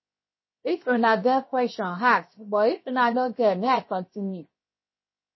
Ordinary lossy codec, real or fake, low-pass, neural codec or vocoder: MP3, 24 kbps; fake; 7.2 kHz; codec, 16 kHz, 0.7 kbps, FocalCodec